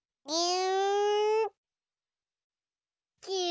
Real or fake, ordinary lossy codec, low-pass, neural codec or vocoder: real; none; none; none